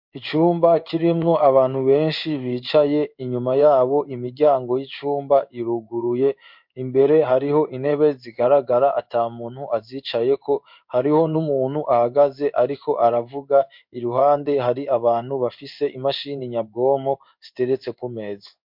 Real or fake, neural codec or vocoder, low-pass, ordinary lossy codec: fake; codec, 16 kHz in and 24 kHz out, 1 kbps, XY-Tokenizer; 5.4 kHz; MP3, 48 kbps